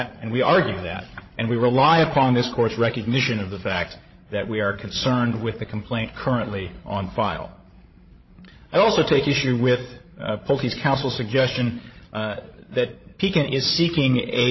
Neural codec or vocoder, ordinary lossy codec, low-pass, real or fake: codec, 16 kHz, 16 kbps, FreqCodec, larger model; MP3, 24 kbps; 7.2 kHz; fake